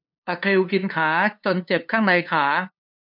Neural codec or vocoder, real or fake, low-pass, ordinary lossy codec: codec, 16 kHz, 2 kbps, FunCodec, trained on LibriTTS, 25 frames a second; fake; 5.4 kHz; none